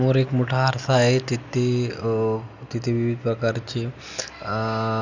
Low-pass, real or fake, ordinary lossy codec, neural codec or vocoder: 7.2 kHz; real; none; none